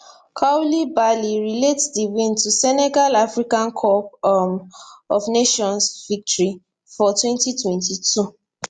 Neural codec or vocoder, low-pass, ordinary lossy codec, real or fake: none; 9.9 kHz; Opus, 64 kbps; real